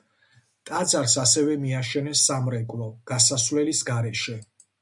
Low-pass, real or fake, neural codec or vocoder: 10.8 kHz; real; none